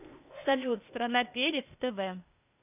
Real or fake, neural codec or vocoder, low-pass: fake; codec, 16 kHz, 0.8 kbps, ZipCodec; 3.6 kHz